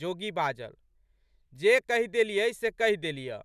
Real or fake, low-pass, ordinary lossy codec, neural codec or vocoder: real; 14.4 kHz; none; none